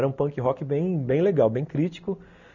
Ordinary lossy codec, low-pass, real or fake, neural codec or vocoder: none; 7.2 kHz; real; none